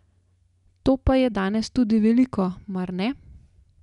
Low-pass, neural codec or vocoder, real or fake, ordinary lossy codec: 10.8 kHz; none; real; none